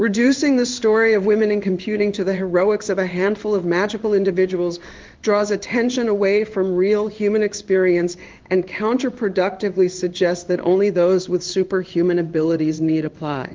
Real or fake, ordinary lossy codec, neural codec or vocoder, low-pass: fake; Opus, 32 kbps; codec, 16 kHz in and 24 kHz out, 1 kbps, XY-Tokenizer; 7.2 kHz